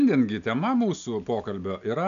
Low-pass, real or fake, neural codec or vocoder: 7.2 kHz; real; none